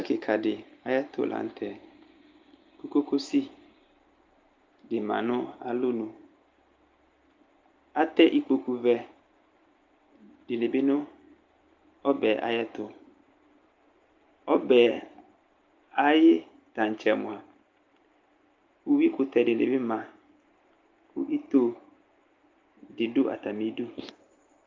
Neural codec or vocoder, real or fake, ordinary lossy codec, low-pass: none; real; Opus, 24 kbps; 7.2 kHz